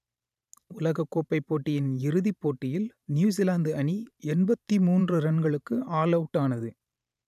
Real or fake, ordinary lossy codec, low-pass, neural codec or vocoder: fake; none; 14.4 kHz; vocoder, 44.1 kHz, 128 mel bands every 512 samples, BigVGAN v2